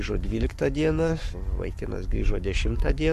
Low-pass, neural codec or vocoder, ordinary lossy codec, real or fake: 14.4 kHz; codec, 44.1 kHz, 7.8 kbps, Pupu-Codec; MP3, 64 kbps; fake